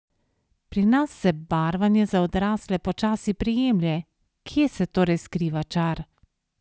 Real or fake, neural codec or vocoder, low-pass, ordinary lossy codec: real; none; none; none